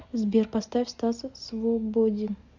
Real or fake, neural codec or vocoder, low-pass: real; none; 7.2 kHz